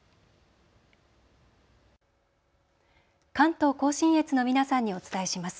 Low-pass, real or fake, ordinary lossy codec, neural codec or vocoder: none; real; none; none